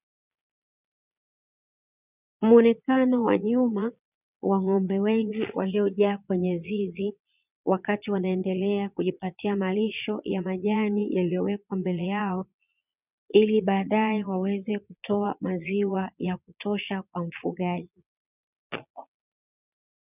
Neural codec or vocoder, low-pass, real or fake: vocoder, 22.05 kHz, 80 mel bands, Vocos; 3.6 kHz; fake